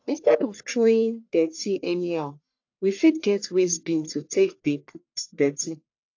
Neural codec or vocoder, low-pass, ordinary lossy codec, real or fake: codec, 44.1 kHz, 1.7 kbps, Pupu-Codec; 7.2 kHz; AAC, 48 kbps; fake